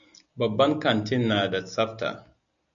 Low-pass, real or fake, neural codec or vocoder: 7.2 kHz; real; none